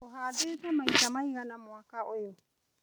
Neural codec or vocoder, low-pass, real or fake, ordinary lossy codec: none; none; real; none